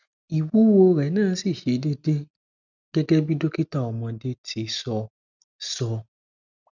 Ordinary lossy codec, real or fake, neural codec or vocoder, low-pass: none; real; none; 7.2 kHz